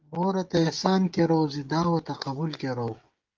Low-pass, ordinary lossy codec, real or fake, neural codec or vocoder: 7.2 kHz; Opus, 32 kbps; fake; codec, 16 kHz, 16 kbps, FreqCodec, smaller model